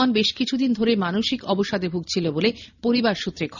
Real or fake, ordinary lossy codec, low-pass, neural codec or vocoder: real; none; 7.2 kHz; none